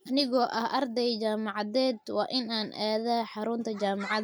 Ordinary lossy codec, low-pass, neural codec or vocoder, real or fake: none; none; none; real